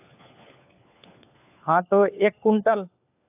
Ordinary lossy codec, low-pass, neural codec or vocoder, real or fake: AAC, 32 kbps; 3.6 kHz; codec, 16 kHz, 4 kbps, FunCodec, trained on LibriTTS, 50 frames a second; fake